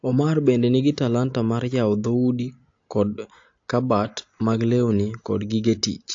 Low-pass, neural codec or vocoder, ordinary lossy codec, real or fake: 7.2 kHz; none; AAC, 48 kbps; real